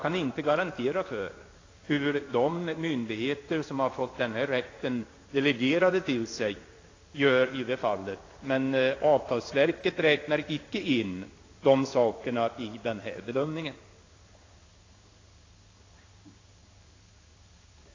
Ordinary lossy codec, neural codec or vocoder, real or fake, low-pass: AAC, 32 kbps; codec, 16 kHz in and 24 kHz out, 1 kbps, XY-Tokenizer; fake; 7.2 kHz